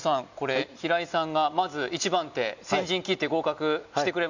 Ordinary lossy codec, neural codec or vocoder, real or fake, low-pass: none; none; real; 7.2 kHz